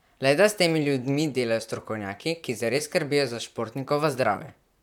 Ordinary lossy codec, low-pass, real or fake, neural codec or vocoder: none; 19.8 kHz; fake; vocoder, 44.1 kHz, 128 mel bands, Pupu-Vocoder